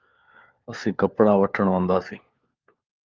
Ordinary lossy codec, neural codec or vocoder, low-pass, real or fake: Opus, 32 kbps; codec, 16 kHz, 4 kbps, FunCodec, trained on LibriTTS, 50 frames a second; 7.2 kHz; fake